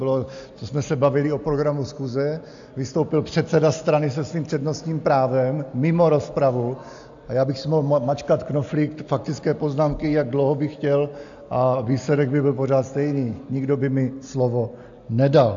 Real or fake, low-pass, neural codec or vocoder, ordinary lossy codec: real; 7.2 kHz; none; AAC, 64 kbps